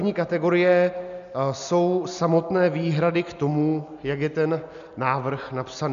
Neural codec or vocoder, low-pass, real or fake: none; 7.2 kHz; real